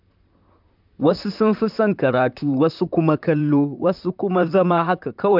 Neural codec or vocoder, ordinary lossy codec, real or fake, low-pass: vocoder, 44.1 kHz, 128 mel bands, Pupu-Vocoder; none; fake; 5.4 kHz